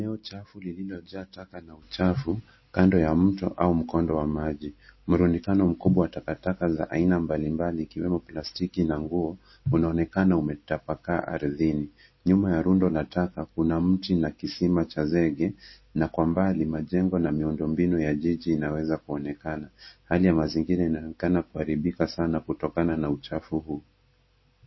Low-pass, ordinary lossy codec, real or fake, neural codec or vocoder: 7.2 kHz; MP3, 24 kbps; real; none